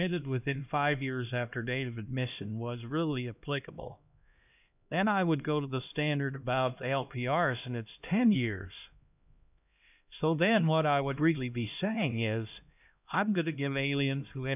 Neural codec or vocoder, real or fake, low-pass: codec, 16 kHz, 1 kbps, X-Codec, HuBERT features, trained on LibriSpeech; fake; 3.6 kHz